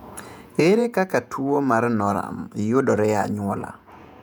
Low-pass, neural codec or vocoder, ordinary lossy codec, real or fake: 19.8 kHz; vocoder, 48 kHz, 128 mel bands, Vocos; none; fake